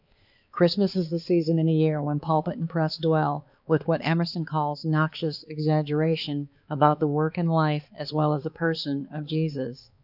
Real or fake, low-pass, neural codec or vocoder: fake; 5.4 kHz; codec, 16 kHz, 4 kbps, X-Codec, HuBERT features, trained on balanced general audio